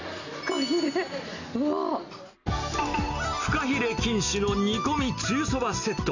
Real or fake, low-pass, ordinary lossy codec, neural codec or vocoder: real; 7.2 kHz; none; none